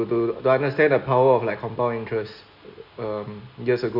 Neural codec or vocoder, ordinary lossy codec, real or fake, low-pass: none; Opus, 64 kbps; real; 5.4 kHz